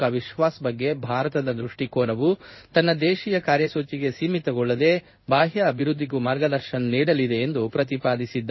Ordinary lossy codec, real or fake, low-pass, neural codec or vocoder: MP3, 24 kbps; fake; 7.2 kHz; codec, 16 kHz in and 24 kHz out, 1 kbps, XY-Tokenizer